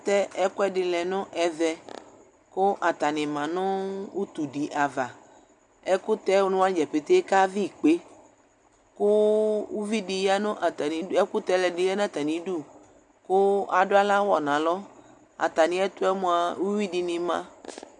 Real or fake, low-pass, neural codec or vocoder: real; 10.8 kHz; none